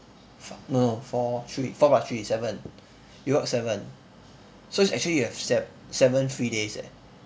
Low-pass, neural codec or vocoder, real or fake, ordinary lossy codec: none; none; real; none